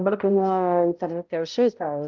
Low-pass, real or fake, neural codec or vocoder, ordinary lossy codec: 7.2 kHz; fake; codec, 16 kHz, 0.5 kbps, X-Codec, HuBERT features, trained on balanced general audio; Opus, 32 kbps